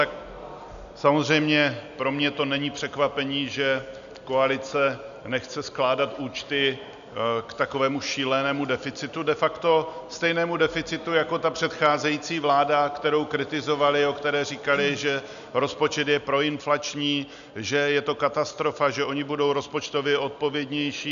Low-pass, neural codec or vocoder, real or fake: 7.2 kHz; none; real